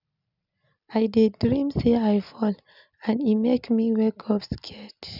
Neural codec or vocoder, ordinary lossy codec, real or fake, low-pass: none; none; real; 5.4 kHz